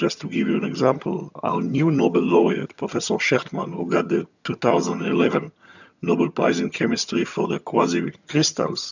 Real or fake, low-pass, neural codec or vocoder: fake; 7.2 kHz; vocoder, 22.05 kHz, 80 mel bands, HiFi-GAN